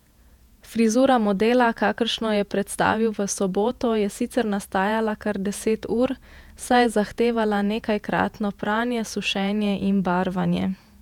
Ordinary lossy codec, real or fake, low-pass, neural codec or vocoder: none; fake; 19.8 kHz; vocoder, 48 kHz, 128 mel bands, Vocos